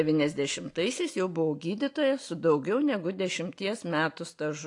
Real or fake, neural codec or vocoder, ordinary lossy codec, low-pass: real; none; AAC, 48 kbps; 10.8 kHz